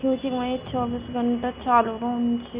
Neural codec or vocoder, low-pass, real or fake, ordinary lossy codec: none; 3.6 kHz; real; Opus, 64 kbps